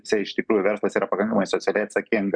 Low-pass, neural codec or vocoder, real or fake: 9.9 kHz; none; real